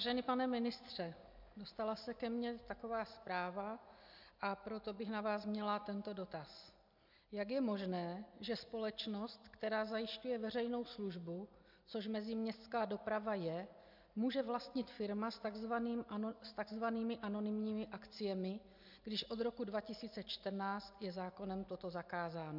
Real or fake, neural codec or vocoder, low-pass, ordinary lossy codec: real; none; 5.4 kHz; MP3, 48 kbps